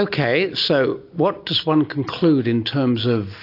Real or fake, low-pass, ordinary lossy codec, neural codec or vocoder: real; 5.4 kHz; AAC, 32 kbps; none